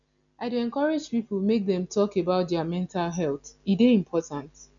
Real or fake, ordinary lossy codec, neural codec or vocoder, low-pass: real; none; none; 7.2 kHz